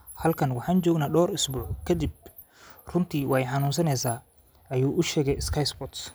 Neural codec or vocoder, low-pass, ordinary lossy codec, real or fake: none; none; none; real